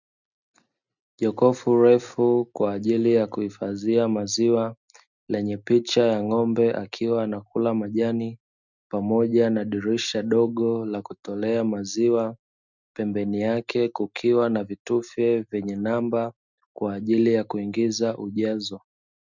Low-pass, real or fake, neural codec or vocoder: 7.2 kHz; real; none